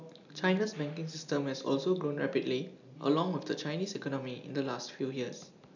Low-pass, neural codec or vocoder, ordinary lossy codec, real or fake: 7.2 kHz; none; none; real